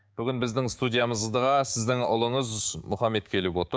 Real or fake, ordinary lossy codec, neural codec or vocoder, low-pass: fake; none; codec, 16 kHz, 6 kbps, DAC; none